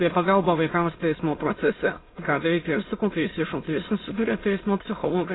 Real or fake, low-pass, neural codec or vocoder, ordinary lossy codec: fake; 7.2 kHz; autoencoder, 22.05 kHz, a latent of 192 numbers a frame, VITS, trained on many speakers; AAC, 16 kbps